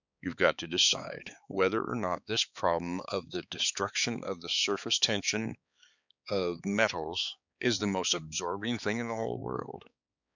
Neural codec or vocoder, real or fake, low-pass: codec, 16 kHz, 4 kbps, X-Codec, HuBERT features, trained on balanced general audio; fake; 7.2 kHz